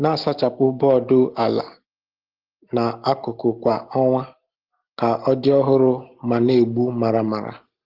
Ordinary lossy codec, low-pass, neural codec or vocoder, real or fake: Opus, 16 kbps; 5.4 kHz; none; real